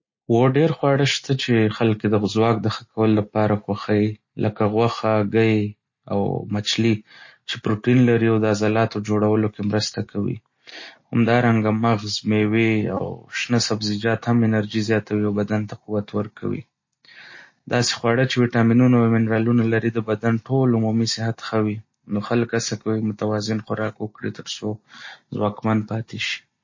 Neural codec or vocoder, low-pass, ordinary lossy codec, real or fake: none; 7.2 kHz; MP3, 32 kbps; real